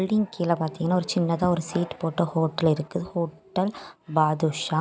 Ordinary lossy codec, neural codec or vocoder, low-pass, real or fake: none; none; none; real